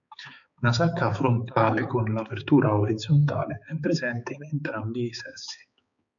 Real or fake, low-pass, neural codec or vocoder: fake; 7.2 kHz; codec, 16 kHz, 4 kbps, X-Codec, HuBERT features, trained on balanced general audio